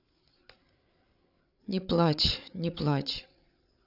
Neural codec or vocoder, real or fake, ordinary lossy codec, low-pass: codec, 16 kHz, 8 kbps, FreqCodec, larger model; fake; none; 5.4 kHz